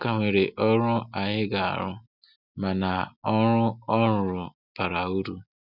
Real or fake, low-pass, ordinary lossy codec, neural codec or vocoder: real; 5.4 kHz; none; none